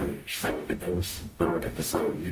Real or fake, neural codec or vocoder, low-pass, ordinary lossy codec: fake; codec, 44.1 kHz, 0.9 kbps, DAC; 14.4 kHz; Opus, 32 kbps